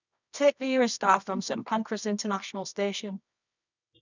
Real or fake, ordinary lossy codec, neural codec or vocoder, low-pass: fake; none; codec, 24 kHz, 0.9 kbps, WavTokenizer, medium music audio release; 7.2 kHz